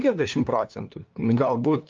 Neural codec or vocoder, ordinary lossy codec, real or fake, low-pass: codec, 16 kHz, 2 kbps, FunCodec, trained on LibriTTS, 25 frames a second; Opus, 24 kbps; fake; 7.2 kHz